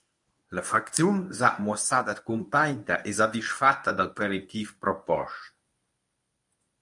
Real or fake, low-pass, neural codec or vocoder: fake; 10.8 kHz; codec, 24 kHz, 0.9 kbps, WavTokenizer, medium speech release version 1